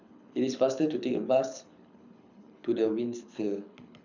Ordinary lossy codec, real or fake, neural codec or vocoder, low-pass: none; fake; codec, 24 kHz, 6 kbps, HILCodec; 7.2 kHz